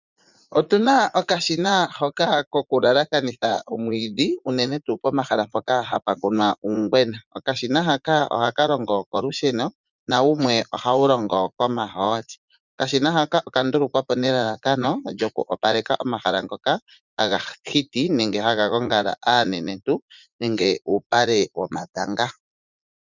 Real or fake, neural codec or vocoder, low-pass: fake; vocoder, 44.1 kHz, 80 mel bands, Vocos; 7.2 kHz